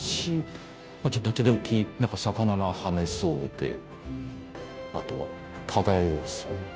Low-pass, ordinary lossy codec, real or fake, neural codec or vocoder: none; none; fake; codec, 16 kHz, 0.5 kbps, FunCodec, trained on Chinese and English, 25 frames a second